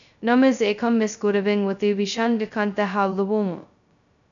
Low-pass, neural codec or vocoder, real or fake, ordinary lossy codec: 7.2 kHz; codec, 16 kHz, 0.2 kbps, FocalCodec; fake; MP3, 96 kbps